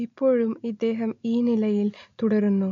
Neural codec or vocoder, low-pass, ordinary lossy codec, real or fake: none; 7.2 kHz; AAC, 48 kbps; real